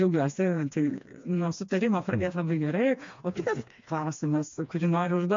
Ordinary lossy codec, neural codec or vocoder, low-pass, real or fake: MP3, 48 kbps; codec, 16 kHz, 2 kbps, FreqCodec, smaller model; 7.2 kHz; fake